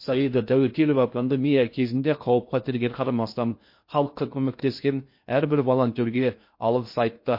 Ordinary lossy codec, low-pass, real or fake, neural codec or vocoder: MP3, 32 kbps; 5.4 kHz; fake; codec, 16 kHz in and 24 kHz out, 0.6 kbps, FocalCodec, streaming, 2048 codes